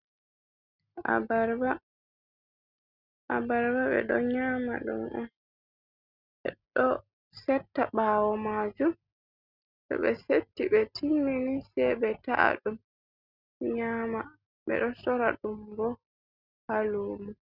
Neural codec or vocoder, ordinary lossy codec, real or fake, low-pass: none; Opus, 64 kbps; real; 5.4 kHz